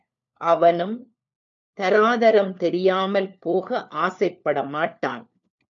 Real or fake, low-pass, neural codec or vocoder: fake; 7.2 kHz; codec, 16 kHz, 4 kbps, FunCodec, trained on LibriTTS, 50 frames a second